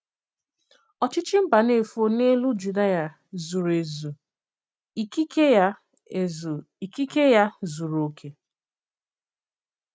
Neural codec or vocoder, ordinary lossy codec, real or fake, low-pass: none; none; real; none